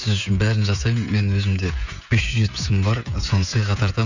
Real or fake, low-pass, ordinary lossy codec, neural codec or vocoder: fake; 7.2 kHz; none; codec, 16 kHz, 16 kbps, FreqCodec, smaller model